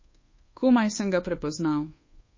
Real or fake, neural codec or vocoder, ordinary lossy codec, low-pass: fake; codec, 24 kHz, 1.2 kbps, DualCodec; MP3, 32 kbps; 7.2 kHz